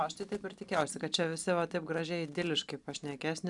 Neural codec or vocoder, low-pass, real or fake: none; 10.8 kHz; real